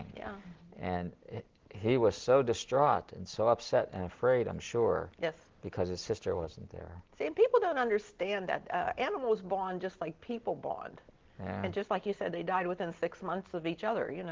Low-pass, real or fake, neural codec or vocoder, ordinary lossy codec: 7.2 kHz; real; none; Opus, 16 kbps